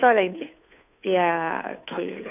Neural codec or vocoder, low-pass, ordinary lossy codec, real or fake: codec, 16 kHz, 2 kbps, FunCodec, trained on Chinese and English, 25 frames a second; 3.6 kHz; none; fake